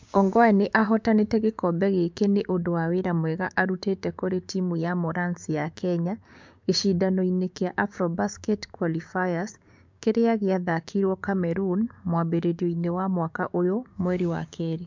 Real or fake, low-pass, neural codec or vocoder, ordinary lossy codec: fake; 7.2 kHz; codec, 16 kHz, 6 kbps, DAC; MP3, 64 kbps